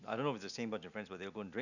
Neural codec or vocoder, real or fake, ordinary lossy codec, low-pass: none; real; none; 7.2 kHz